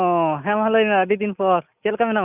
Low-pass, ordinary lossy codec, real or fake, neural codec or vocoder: 3.6 kHz; none; real; none